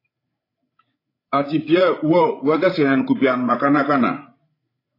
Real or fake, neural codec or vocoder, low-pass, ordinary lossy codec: fake; codec, 16 kHz, 16 kbps, FreqCodec, larger model; 5.4 kHz; AAC, 24 kbps